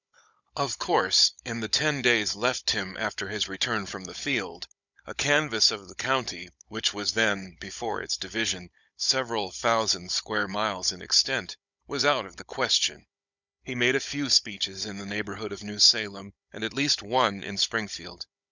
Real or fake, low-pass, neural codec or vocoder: fake; 7.2 kHz; codec, 16 kHz, 16 kbps, FunCodec, trained on Chinese and English, 50 frames a second